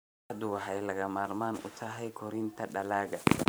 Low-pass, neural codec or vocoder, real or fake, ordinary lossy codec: none; vocoder, 44.1 kHz, 128 mel bands every 512 samples, BigVGAN v2; fake; none